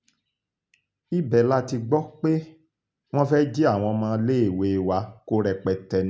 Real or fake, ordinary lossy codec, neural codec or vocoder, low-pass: real; none; none; none